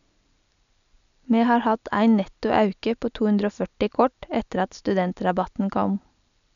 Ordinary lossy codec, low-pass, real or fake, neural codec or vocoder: none; 7.2 kHz; real; none